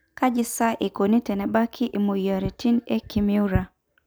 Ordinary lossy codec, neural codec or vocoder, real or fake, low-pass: none; none; real; none